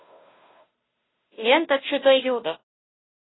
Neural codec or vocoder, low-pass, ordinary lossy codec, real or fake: codec, 16 kHz, 0.5 kbps, FunCodec, trained on Chinese and English, 25 frames a second; 7.2 kHz; AAC, 16 kbps; fake